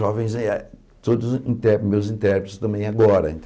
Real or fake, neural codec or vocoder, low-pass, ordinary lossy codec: real; none; none; none